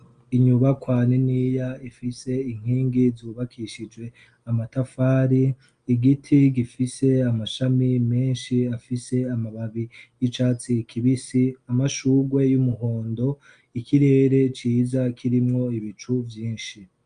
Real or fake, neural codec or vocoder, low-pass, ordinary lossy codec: real; none; 9.9 kHz; Opus, 32 kbps